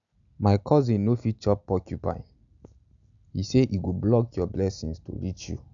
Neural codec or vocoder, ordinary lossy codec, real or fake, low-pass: none; none; real; 7.2 kHz